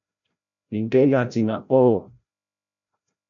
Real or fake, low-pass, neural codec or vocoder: fake; 7.2 kHz; codec, 16 kHz, 0.5 kbps, FreqCodec, larger model